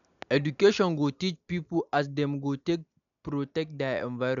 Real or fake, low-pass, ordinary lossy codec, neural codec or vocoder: real; 7.2 kHz; none; none